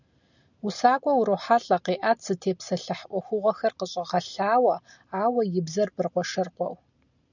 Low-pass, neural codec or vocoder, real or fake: 7.2 kHz; none; real